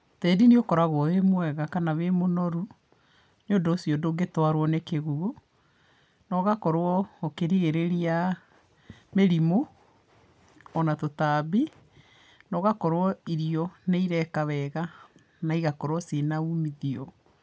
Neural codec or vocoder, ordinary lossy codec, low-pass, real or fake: none; none; none; real